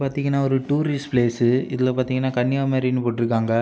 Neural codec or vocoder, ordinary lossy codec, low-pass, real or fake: none; none; none; real